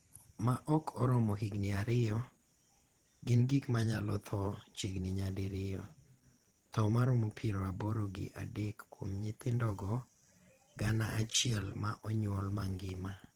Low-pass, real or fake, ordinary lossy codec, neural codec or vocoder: 19.8 kHz; fake; Opus, 16 kbps; vocoder, 44.1 kHz, 128 mel bands every 512 samples, BigVGAN v2